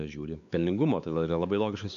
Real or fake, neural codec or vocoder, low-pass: fake; codec, 16 kHz, 4 kbps, X-Codec, WavLM features, trained on Multilingual LibriSpeech; 7.2 kHz